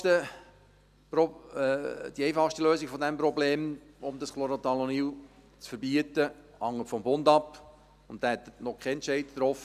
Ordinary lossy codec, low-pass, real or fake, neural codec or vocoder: none; 14.4 kHz; real; none